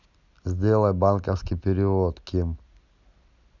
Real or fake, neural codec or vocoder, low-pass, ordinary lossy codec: real; none; 7.2 kHz; none